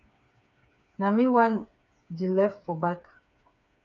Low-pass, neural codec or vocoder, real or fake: 7.2 kHz; codec, 16 kHz, 4 kbps, FreqCodec, smaller model; fake